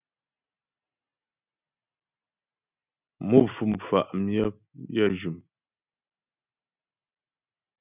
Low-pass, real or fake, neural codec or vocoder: 3.6 kHz; real; none